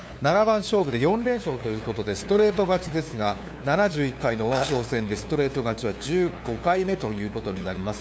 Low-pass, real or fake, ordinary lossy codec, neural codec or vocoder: none; fake; none; codec, 16 kHz, 2 kbps, FunCodec, trained on LibriTTS, 25 frames a second